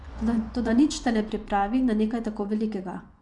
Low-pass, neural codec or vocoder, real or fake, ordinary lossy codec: 10.8 kHz; vocoder, 24 kHz, 100 mel bands, Vocos; fake; MP3, 96 kbps